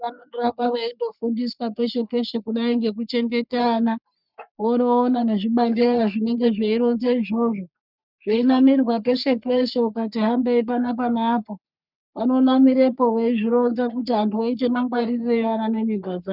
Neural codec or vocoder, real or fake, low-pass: codec, 44.1 kHz, 3.4 kbps, Pupu-Codec; fake; 5.4 kHz